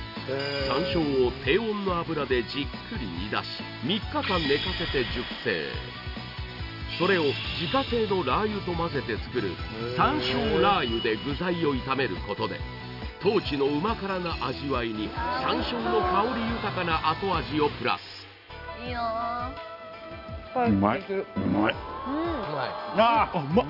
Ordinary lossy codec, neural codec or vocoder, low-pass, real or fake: none; none; 5.4 kHz; real